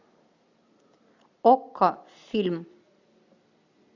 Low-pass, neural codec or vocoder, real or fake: 7.2 kHz; none; real